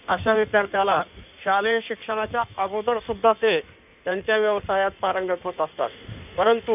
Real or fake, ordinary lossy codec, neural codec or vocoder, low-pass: fake; none; codec, 16 kHz in and 24 kHz out, 1.1 kbps, FireRedTTS-2 codec; 3.6 kHz